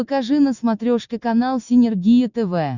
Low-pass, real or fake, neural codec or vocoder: 7.2 kHz; real; none